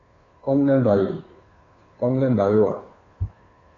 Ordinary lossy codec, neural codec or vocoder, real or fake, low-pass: AAC, 32 kbps; codec, 16 kHz, 2 kbps, FunCodec, trained on Chinese and English, 25 frames a second; fake; 7.2 kHz